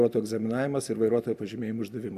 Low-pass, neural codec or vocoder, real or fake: 14.4 kHz; none; real